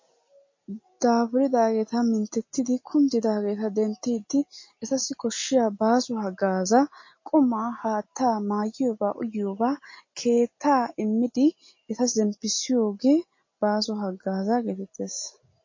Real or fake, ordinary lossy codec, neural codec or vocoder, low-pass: real; MP3, 32 kbps; none; 7.2 kHz